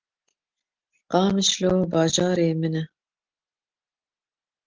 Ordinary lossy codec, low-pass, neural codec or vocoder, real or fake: Opus, 16 kbps; 7.2 kHz; none; real